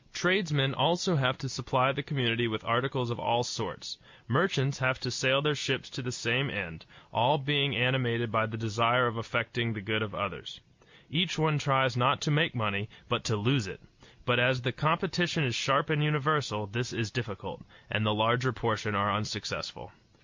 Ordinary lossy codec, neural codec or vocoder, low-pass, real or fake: MP3, 64 kbps; none; 7.2 kHz; real